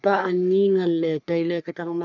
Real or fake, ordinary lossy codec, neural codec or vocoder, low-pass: fake; none; codec, 44.1 kHz, 3.4 kbps, Pupu-Codec; 7.2 kHz